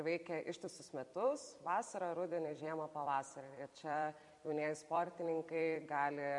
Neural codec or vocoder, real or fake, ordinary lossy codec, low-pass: vocoder, 44.1 kHz, 128 mel bands every 256 samples, BigVGAN v2; fake; MP3, 64 kbps; 10.8 kHz